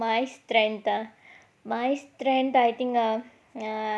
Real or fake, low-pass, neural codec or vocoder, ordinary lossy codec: real; none; none; none